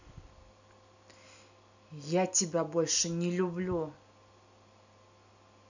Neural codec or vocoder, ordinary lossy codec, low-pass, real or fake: none; none; 7.2 kHz; real